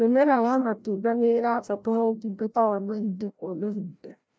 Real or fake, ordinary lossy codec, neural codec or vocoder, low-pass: fake; none; codec, 16 kHz, 0.5 kbps, FreqCodec, larger model; none